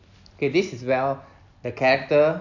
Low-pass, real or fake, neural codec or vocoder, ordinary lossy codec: 7.2 kHz; real; none; none